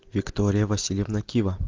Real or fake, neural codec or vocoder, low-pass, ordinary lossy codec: real; none; 7.2 kHz; Opus, 32 kbps